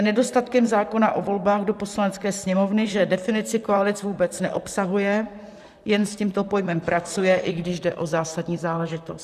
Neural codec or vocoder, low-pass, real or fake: vocoder, 44.1 kHz, 128 mel bands, Pupu-Vocoder; 14.4 kHz; fake